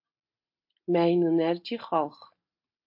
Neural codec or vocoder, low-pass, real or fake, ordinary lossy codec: none; 5.4 kHz; real; MP3, 32 kbps